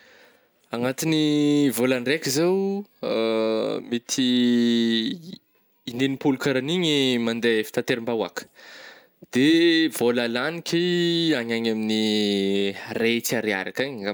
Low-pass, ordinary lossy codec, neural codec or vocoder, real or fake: none; none; none; real